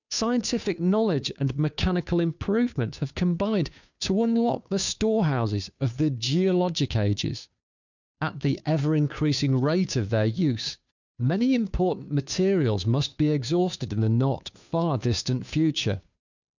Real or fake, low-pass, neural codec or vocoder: fake; 7.2 kHz; codec, 16 kHz, 2 kbps, FunCodec, trained on Chinese and English, 25 frames a second